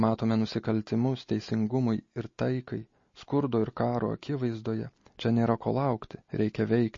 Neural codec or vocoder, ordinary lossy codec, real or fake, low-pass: none; MP3, 32 kbps; real; 7.2 kHz